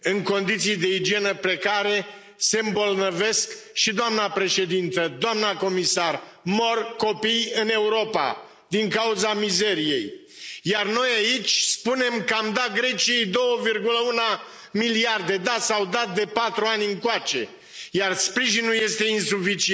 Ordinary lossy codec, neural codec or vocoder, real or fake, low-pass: none; none; real; none